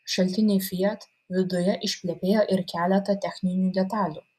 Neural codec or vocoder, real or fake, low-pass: none; real; 14.4 kHz